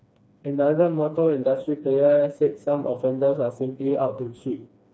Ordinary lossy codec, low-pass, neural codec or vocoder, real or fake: none; none; codec, 16 kHz, 2 kbps, FreqCodec, smaller model; fake